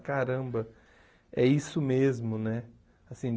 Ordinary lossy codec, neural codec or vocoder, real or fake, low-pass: none; none; real; none